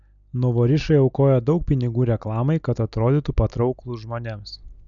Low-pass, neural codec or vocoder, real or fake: 7.2 kHz; none; real